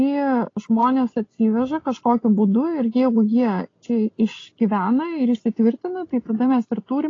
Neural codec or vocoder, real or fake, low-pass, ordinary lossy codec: none; real; 7.2 kHz; AAC, 32 kbps